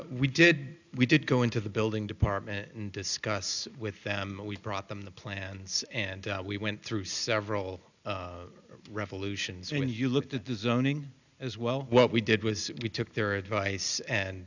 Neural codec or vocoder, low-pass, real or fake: none; 7.2 kHz; real